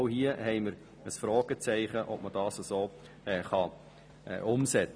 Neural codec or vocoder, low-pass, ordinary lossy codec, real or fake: none; none; none; real